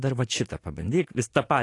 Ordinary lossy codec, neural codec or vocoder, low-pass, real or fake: AAC, 48 kbps; none; 10.8 kHz; real